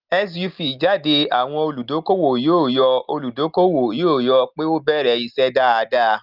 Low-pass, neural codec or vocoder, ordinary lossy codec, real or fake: 5.4 kHz; none; Opus, 24 kbps; real